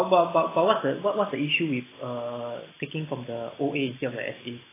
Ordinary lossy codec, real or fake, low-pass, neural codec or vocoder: AAC, 16 kbps; real; 3.6 kHz; none